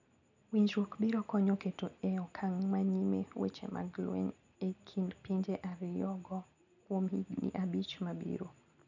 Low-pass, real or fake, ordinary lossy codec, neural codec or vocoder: 7.2 kHz; real; none; none